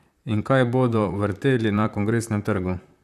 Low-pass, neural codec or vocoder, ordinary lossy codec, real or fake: 14.4 kHz; vocoder, 44.1 kHz, 128 mel bands, Pupu-Vocoder; none; fake